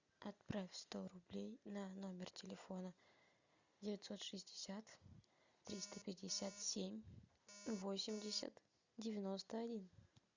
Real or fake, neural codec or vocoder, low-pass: real; none; 7.2 kHz